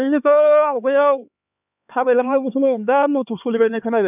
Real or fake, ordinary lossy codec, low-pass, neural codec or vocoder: fake; none; 3.6 kHz; codec, 16 kHz, 4 kbps, X-Codec, HuBERT features, trained on LibriSpeech